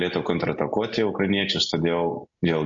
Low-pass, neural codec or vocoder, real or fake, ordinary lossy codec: 7.2 kHz; none; real; MP3, 48 kbps